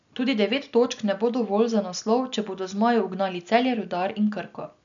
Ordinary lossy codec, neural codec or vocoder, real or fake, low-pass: none; none; real; 7.2 kHz